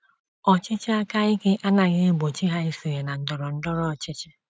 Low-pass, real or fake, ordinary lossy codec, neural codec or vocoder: none; real; none; none